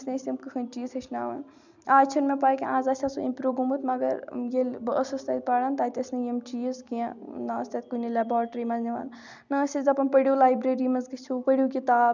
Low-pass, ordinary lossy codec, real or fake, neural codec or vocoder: 7.2 kHz; none; real; none